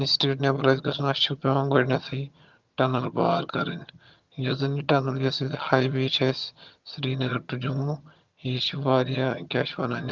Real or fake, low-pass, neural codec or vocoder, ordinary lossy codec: fake; 7.2 kHz; vocoder, 22.05 kHz, 80 mel bands, HiFi-GAN; Opus, 32 kbps